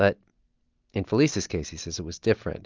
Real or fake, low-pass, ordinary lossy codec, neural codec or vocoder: real; 7.2 kHz; Opus, 32 kbps; none